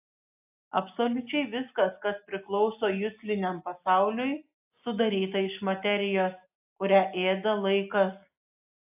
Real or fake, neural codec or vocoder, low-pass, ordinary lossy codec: real; none; 3.6 kHz; AAC, 32 kbps